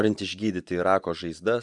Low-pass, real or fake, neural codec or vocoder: 10.8 kHz; fake; vocoder, 24 kHz, 100 mel bands, Vocos